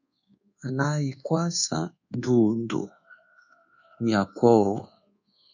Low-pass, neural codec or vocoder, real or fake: 7.2 kHz; codec, 24 kHz, 1.2 kbps, DualCodec; fake